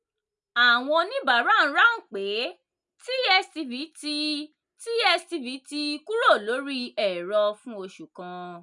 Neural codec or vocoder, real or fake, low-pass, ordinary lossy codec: none; real; 10.8 kHz; none